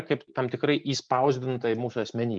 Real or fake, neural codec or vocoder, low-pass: real; none; 9.9 kHz